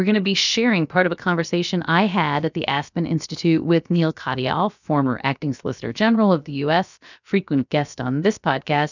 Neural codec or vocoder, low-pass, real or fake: codec, 16 kHz, about 1 kbps, DyCAST, with the encoder's durations; 7.2 kHz; fake